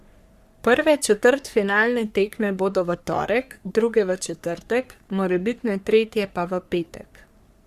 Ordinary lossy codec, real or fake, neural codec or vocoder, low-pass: Opus, 64 kbps; fake; codec, 44.1 kHz, 3.4 kbps, Pupu-Codec; 14.4 kHz